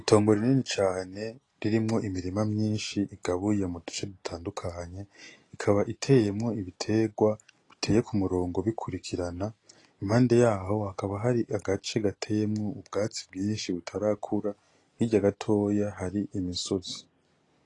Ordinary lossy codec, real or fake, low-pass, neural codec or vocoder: AAC, 32 kbps; real; 10.8 kHz; none